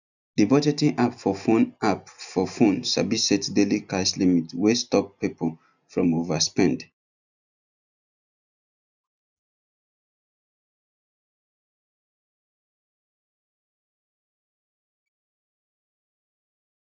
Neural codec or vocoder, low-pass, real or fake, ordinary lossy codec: none; 7.2 kHz; real; none